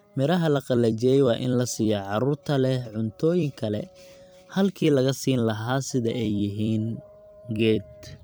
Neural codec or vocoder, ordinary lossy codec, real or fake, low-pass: vocoder, 44.1 kHz, 128 mel bands every 256 samples, BigVGAN v2; none; fake; none